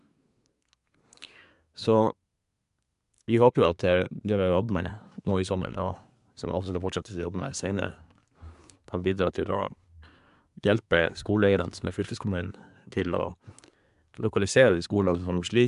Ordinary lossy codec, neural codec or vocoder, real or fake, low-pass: none; codec, 24 kHz, 1 kbps, SNAC; fake; 10.8 kHz